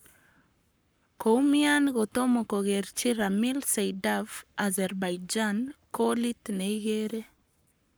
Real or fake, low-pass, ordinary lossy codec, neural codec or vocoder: fake; none; none; codec, 44.1 kHz, 7.8 kbps, Pupu-Codec